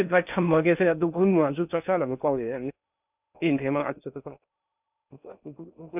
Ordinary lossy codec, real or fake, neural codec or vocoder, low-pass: none; fake; codec, 16 kHz in and 24 kHz out, 0.6 kbps, FocalCodec, streaming, 2048 codes; 3.6 kHz